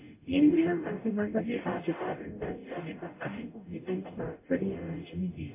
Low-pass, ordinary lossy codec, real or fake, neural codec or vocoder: 3.6 kHz; MP3, 24 kbps; fake; codec, 44.1 kHz, 0.9 kbps, DAC